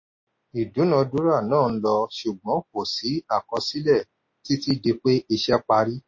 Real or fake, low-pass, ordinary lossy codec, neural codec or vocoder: real; 7.2 kHz; MP3, 32 kbps; none